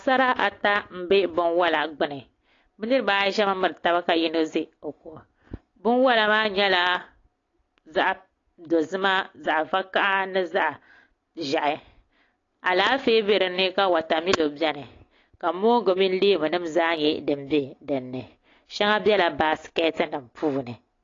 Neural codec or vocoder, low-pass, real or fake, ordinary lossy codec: none; 7.2 kHz; real; AAC, 32 kbps